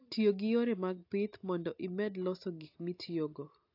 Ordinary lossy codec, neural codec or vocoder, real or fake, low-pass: AAC, 32 kbps; none; real; 5.4 kHz